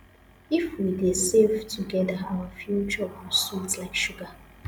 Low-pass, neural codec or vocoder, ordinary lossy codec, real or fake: none; none; none; real